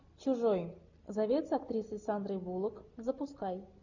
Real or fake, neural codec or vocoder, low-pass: real; none; 7.2 kHz